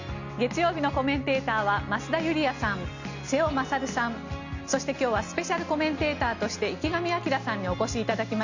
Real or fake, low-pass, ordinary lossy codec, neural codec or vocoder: real; 7.2 kHz; Opus, 64 kbps; none